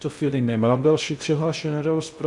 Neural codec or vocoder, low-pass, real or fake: codec, 16 kHz in and 24 kHz out, 0.6 kbps, FocalCodec, streaming, 2048 codes; 10.8 kHz; fake